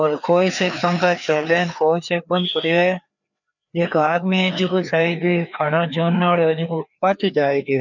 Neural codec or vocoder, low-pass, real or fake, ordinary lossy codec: codec, 16 kHz, 2 kbps, FreqCodec, larger model; 7.2 kHz; fake; none